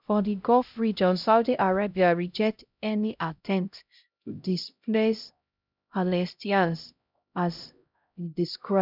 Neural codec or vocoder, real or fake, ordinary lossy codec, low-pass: codec, 16 kHz, 0.5 kbps, X-Codec, HuBERT features, trained on LibriSpeech; fake; none; 5.4 kHz